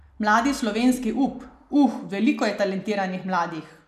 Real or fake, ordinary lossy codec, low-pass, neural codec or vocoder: real; none; 14.4 kHz; none